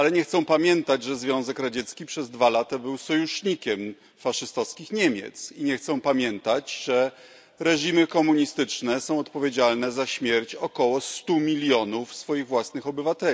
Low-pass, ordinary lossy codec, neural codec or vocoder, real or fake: none; none; none; real